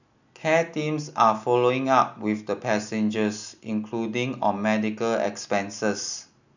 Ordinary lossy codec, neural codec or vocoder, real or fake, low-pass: none; none; real; 7.2 kHz